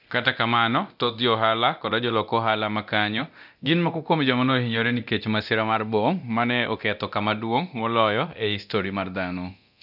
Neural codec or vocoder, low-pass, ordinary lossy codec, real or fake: codec, 24 kHz, 0.9 kbps, DualCodec; 5.4 kHz; none; fake